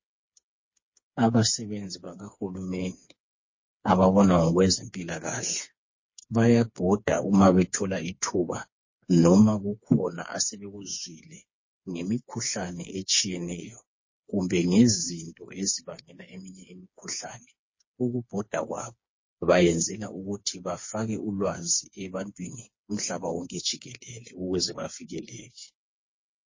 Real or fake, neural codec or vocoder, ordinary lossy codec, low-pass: fake; codec, 16 kHz, 4 kbps, FreqCodec, smaller model; MP3, 32 kbps; 7.2 kHz